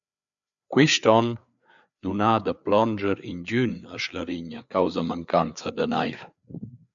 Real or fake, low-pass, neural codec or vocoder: fake; 7.2 kHz; codec, 16 kHz, 4 kbps, FreqCodec, larger model